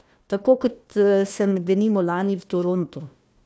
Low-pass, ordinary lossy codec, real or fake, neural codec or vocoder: none; none; fake; codec, 16 kHz, 1 kbps, FunCodec, trained on Chinese and English, 50 frames a second